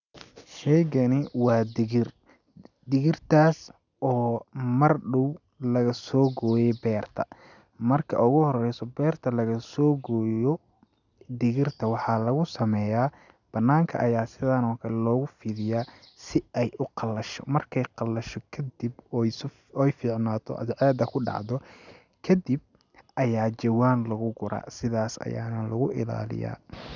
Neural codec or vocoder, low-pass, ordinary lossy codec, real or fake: none; 7.2 kHz; none; real